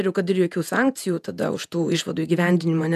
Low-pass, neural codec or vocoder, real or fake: 14.4 kHz; vocoder, 48 kHz, 128 mel bands, Vocos; fake